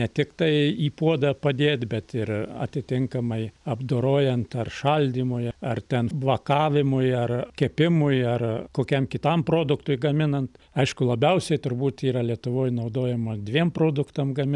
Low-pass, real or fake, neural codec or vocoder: 10.8 kHz; fake; vocoder, 44.1 kHz, 128 mel bands every 256 samples, BigVGAN v2